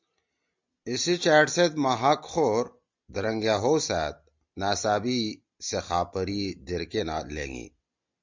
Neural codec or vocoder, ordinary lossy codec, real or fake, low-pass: none; MP3, 64 kbps; real; 7.2 kHz